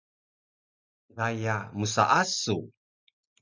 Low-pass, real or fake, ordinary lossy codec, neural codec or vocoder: 7.2 kHz; real; MP3, 64 kbps; none